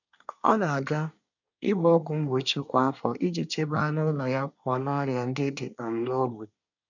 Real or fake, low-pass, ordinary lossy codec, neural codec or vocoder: fake; 7.2 kHz; none; codec, 24 kHz, 1 kbps, SNAC